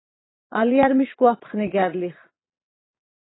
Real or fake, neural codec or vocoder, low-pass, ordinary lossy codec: fake; vocoder, 22.05 kHz, 80 mel bands, Vocos; 7.2 kHz; AAC, 16 kbps